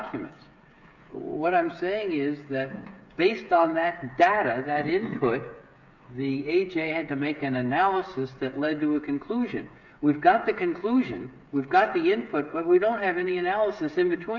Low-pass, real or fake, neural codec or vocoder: 7.2 kHz; fake; codec, 16 kHz, 8 kbps, FreqCodec, smaller model